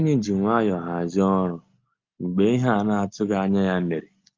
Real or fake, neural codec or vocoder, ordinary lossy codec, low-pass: real; none; Opus, 24 kbps; 7.2 kHz